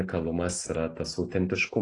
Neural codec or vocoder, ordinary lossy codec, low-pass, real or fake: none; AAC, 32 kbps; 10.8 kHz; real